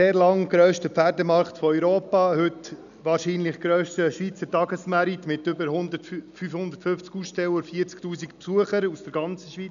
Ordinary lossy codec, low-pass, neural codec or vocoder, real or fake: none; 7.2 kHz; none; real